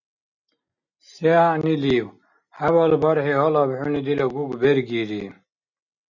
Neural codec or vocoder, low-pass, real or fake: none; 7.2 kHz; real